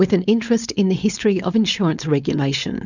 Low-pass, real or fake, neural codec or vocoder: 7.2 kHz; fake; codec, 16 kHz, 4.8 kbps, FACodec